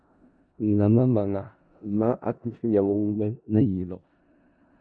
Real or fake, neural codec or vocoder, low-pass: fake; codec, 16 kHz in and 24 kHz out, 0.4 kbps, LongCat-Audio-Codec, four codebook decoder; 9.9 kHz